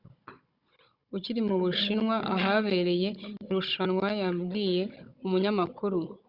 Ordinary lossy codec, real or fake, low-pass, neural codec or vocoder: Opus, 64 kbps; fake; 5.4 kHz; codec, 16 kHz, 16 kbps, FunCodec, trained on Chinese and English, 50 frames a second